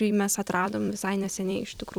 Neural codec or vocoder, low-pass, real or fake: vocoder, 44.1 kHz, 128 mel bands every 256 samples, BigVGAN v2; 19.8 kHz; fake